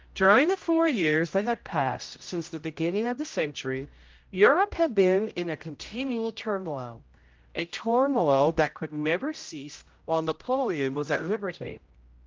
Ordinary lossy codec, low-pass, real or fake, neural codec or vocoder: Opus, 32 kbps; 7.2 kHz; fake; codec, 16 kHz, 0.5 kbps, X-Codec, HuBERT features, trained on general audio